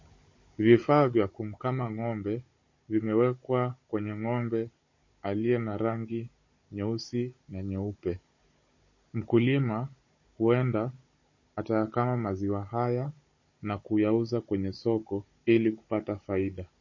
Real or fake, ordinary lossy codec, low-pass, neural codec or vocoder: fake; MP3, 32 kbps; 7.2 kHz; codec, 16 kHz, 16 kbps, FunCodec, trained on Chinese and English, 50 frames a second